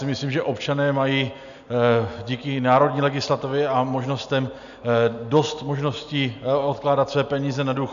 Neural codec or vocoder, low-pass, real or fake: none; 7.2 kHz; real